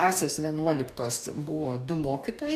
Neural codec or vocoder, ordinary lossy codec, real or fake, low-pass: codec, 44.1 kHz, 2.6 kbps, DAC; AAC, 96 kbps; fake; 14.4 kHz